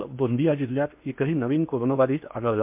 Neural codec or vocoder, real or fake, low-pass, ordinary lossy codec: codec, 16 kHz in and 24 kHz out, 0.8 kbps, FocalCodec, streaming, 65536 codes; fake; 3.6 kHz; MP3, 32 kbps